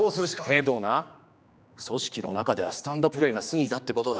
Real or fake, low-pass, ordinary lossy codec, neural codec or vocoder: fake; none; none; codec, 16 kHz, 2 kbps, X-Codec, HuBERT features, trained on general audio